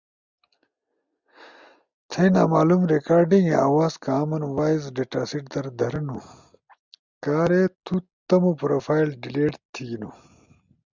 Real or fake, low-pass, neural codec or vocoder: real; 7.2 kHz; none